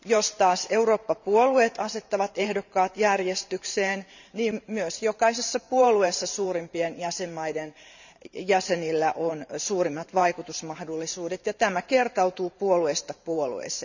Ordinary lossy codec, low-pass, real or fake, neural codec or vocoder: none; 7.2 kHz; fake; vocoder, 44.1 kHz, 128 mel bands every 512 samples, BigVGAN v2